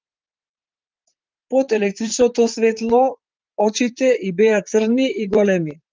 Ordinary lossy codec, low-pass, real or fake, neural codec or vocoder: Opus, 32 kbps; 7.2 kHz; fake; vocoder, 44.1 kHz, 128 mel bands, Pupu-Vocoder